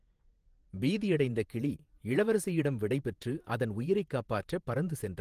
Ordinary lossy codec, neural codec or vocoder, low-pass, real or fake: Opus, 24 kbps; vocoder, 48 kHz, 128 mel bands, Vocos; 14.4 kHz; fake